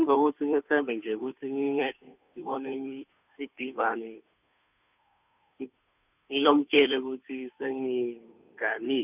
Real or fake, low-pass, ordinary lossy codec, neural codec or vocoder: fake; 3.6 kHz; none; codec, 16 kHz, 2 kbps, FunCodec, trained on Chinese and English, 25 frames a second